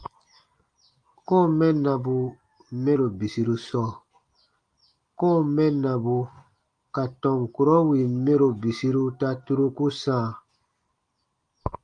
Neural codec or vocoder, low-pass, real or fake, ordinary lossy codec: none; 9.9 kHz; real; Opus, 32 kbps